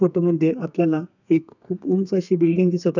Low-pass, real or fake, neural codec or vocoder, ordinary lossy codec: 7.2 kHz; fake; codec, 32 kHz, 1.9 kbps, SNAC; none